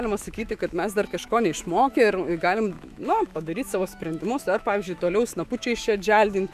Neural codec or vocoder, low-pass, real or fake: autoencoder, 48 kHz, 128 numbers a frame, DAC-VAE, trained on Japanese speech; 14.4 kHz; fake